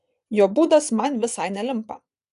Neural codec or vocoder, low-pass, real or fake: vocoder, 24 kHz, 100 mel bands, Vocos; 10.8 kHz; fake